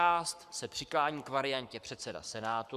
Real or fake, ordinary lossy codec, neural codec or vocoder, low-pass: fake; Opus, 64 kbps; codec, 44.1 kHz, 7.8 kbps, Pupu-Codec; 14.4 kHz